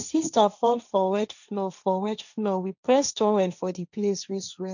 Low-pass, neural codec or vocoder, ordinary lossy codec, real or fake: 7.2 kHz; codec, 16 kHz, 1.1 kbps, Voila-Tokenizer; none; fake